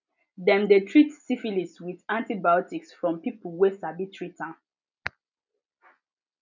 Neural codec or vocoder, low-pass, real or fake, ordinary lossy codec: none; 7.2 kHz; real; none